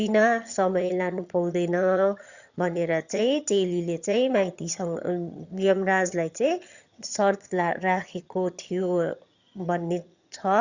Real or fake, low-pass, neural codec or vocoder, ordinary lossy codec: fake; 7.2 kHz; vocoder, 22.05 kHz, 80 mel bands, HiFi-GAN; Opus, 64 kbps